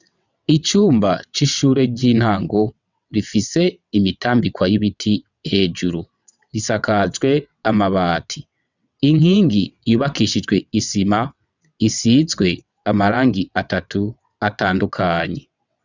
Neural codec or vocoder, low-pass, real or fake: vocoder, 22.05 kHz, 80 mel bands, WaveNeXt; 7.2 kHz; fake